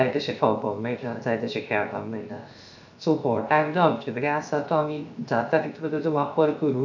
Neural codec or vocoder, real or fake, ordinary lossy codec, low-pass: codec, 16 kHz, 0.7 kbps, FocalCodec; fake; none; 7.2 kHz